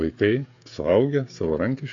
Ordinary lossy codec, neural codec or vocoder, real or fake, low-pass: AAC, 48 kbps; codec, 16 kHz, 16 kbps, FreqCodec, smaller model; fake; 7.2 kHz